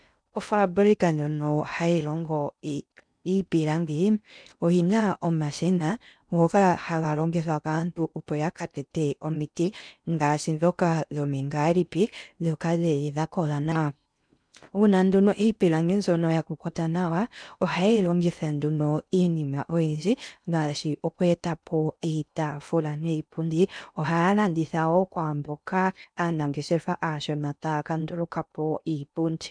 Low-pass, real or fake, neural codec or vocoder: 9.9 kHz; fake; codec, 16 kHz in and 24 kHz out, 0.6 kbps, FocalCodec, streaming, 2048 codes